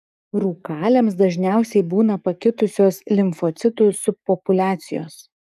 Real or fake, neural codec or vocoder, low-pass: fake; codec, 44.1 kHz, 7.8 kbps, DAC; 14.4 kHz